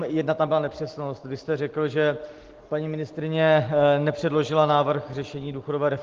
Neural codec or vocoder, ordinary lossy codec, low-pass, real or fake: none; Opus, 16 kbps; 7.2 kHz; real